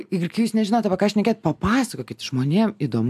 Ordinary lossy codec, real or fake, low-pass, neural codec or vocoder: AAC, 96 kbps; real; 14.4 kHz; none